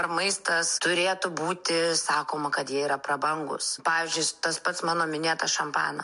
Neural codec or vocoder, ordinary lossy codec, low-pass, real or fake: none; MP3, 64 kbps; 10.8 kHz; real